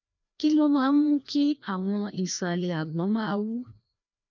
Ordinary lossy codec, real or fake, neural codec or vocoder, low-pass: none; fake; codec, 16 kHz, 1 kbps, FreqCodec, larger model; 7.2 kHz